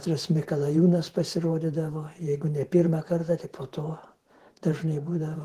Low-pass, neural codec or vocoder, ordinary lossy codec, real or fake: 14.4 kHz; vocoder, 48 kHz, 128 mel bands, Vocos; Opus, 16 kbps; fake